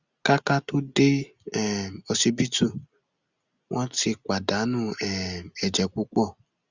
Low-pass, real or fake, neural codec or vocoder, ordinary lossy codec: 7.2 kHz; real; none; Opus, 64 kbps